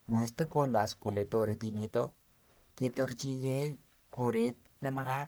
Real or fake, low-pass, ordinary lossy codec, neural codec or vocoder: fake; none; none; codec, 44.1 kHz, 1.7 kbps, Pupu-Codec